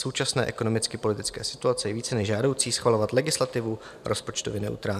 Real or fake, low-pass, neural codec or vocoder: fake; 14.4 kHz; vocoder, 44.1 kHz, 128 mel bands every 512 samples, BigVGAN v2